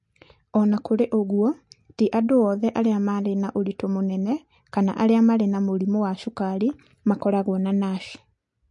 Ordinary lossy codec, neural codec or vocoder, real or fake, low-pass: MP3, 48 kbps; none; real; 10.8 kHz